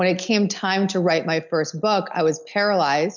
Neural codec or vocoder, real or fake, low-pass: none; real; 7.2 kHz